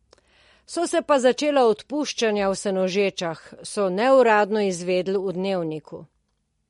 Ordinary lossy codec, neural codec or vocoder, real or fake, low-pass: MP3, 48 kbps; none; real; 19.8 kHz